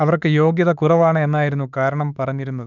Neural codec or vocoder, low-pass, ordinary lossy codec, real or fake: autoencoder, 48 kHz, 32 numbers a frame, DAC-VAE, trained on Japanese speech; 7.2 kHz; none; fake